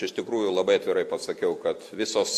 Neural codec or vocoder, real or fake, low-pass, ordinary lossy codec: none; real; 14.4 kHz; AAC, 64 kbps